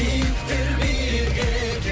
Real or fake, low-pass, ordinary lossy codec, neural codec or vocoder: real; none; none; none